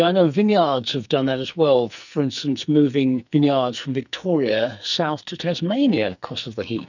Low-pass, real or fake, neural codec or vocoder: 7.2 kHz; fake; codec, 44.1 kHz, 2.6 kbps, SNAC